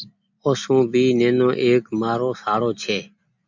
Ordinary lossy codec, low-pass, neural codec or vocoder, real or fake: MP3, 64 kbps; 7.2 kHz; none; real